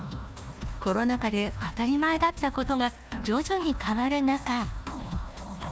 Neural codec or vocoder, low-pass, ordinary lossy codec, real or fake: codec, 16 kHz, 1 kbps, FunCodec, trained on Chinese and English, 50 frames a second; none; none; fake